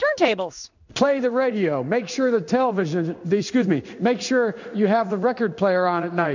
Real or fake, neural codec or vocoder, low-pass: fake; codec, 16 kHz in and 24 kHz out, 1 kbps, XY-Tokenizer; 7.2 kHz